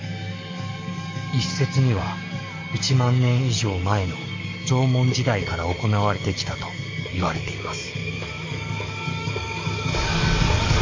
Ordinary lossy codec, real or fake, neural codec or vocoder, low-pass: MP3, 64 kbps; fake; codec, 44.1 kHz, 7.8 kbps, DAC; 7.2 kHz